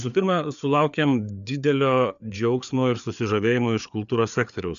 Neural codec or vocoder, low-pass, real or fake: codec, 16 kHz, 4 kbps, FreqCodec, larger model; 7.2 kHz; fake